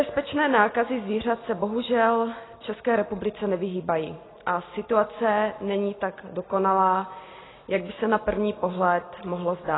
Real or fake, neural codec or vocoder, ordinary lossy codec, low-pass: real; none; AAC, 16 kbps; 7.2 kHz